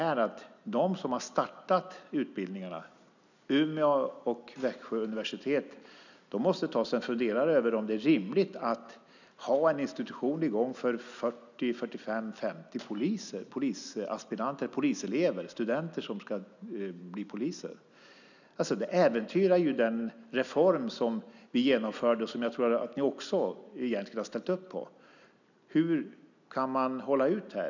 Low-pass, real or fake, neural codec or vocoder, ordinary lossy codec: 7.2 kHz; real; none; none